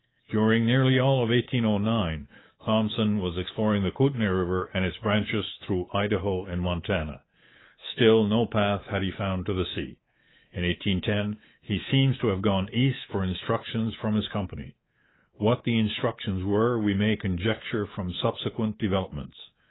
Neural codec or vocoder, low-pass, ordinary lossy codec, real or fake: codec, 24 kHz, 3.1 kbps, DualCodec; 7.2 kHz; AAC, 16 kbps; fake